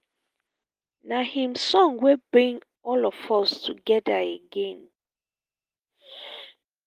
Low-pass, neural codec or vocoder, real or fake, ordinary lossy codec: 14.4 kHz; none; real; Opus, 24 kbps